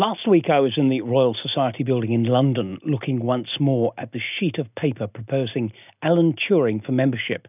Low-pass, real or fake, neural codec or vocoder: 3.6 kHz; real; none